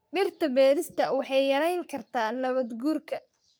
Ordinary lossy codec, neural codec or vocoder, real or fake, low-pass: none; codec, 44.1 kHz, 3.4 kbps, Pupu-Codec; fake; none